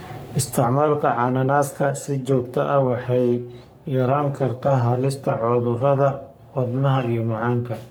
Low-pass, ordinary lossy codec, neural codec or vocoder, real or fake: none; none; codec, 44.1 kHz, 3.4 kbps, Pupu-Codec; fake